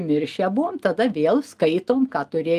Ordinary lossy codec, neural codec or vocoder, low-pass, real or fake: Opus, 32 kbps; none; 14.4 kHz; real